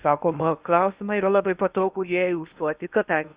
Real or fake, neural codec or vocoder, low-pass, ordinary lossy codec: fake; codec, 16 kHz in and 24 kHz out, 0.8 kbps, FocalCodec, streaming, 65536 codes; 3.6 kHz; Opus, 64 kbps